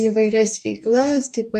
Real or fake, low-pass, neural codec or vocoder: fake; 14.4 kHz; codec, 44.1 kHz, 2.6 kbps, DAC